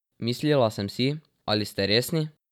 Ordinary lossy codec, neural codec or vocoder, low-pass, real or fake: none; none; 19.8 kHz; real